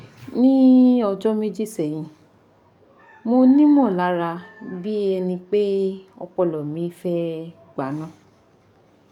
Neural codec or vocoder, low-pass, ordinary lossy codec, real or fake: codec, 44.1 kHz, 7.8 kbps, DAC; 19.8 kHz; none; fake